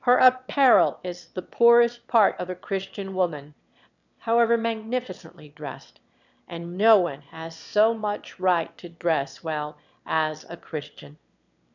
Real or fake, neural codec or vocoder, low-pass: fake; autoencoder, 22.05 kHz, a latent of 192 numbers a frame, VITS, trained on one speaker; 7.2 kHz